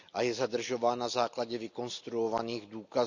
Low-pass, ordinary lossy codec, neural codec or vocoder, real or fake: 7.2 kHz; none; none; real